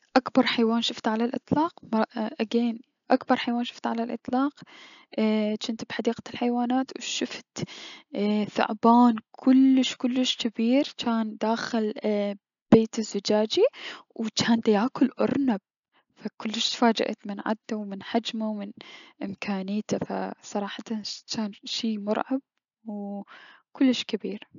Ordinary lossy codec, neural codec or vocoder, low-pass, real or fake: none; none; 7.2 kHz; real